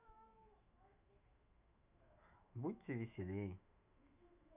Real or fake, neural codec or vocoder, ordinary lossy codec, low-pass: fake; autoencoder, 48 kHz, 128 numbers a frame, DAC-VAE, trained on Japanese speech; none; 3.6 kHz